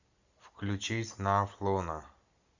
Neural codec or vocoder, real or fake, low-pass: none; real; 7.2 kHz